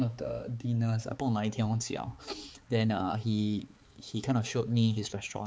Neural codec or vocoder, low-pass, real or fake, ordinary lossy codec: codec, 16 kHz, 4 kbps, X-Codec, HuBERT features, trained on balanced general audio; none; fake; none